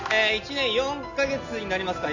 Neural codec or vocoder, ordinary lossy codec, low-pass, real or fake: none; none; 7.2 kHz; real